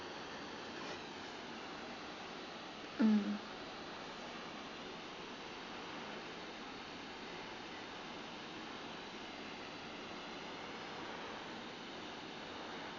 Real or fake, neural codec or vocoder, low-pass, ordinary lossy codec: real; none; 7.2 kHz; none